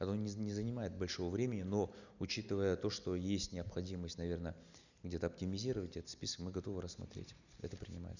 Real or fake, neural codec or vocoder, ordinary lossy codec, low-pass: real; none; none; 7.2 kHz